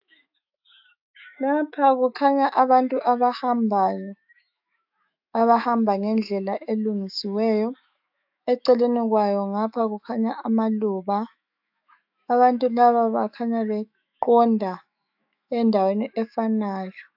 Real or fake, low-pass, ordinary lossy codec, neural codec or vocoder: fake; 5.4 kHz; AAC, 48 kbps; autoencoder, 48 kHz, 128 numbers a frame, DAC-VAE, trained on Japanese speech